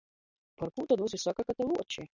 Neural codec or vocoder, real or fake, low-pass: none; real; 7.2 kHz